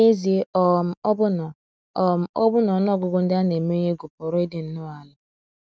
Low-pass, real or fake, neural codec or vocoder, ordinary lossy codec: none; real; none; none